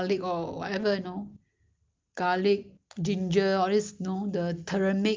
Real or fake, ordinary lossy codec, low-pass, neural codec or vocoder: real; Opus, 24 kbps; 7.2 kHz; none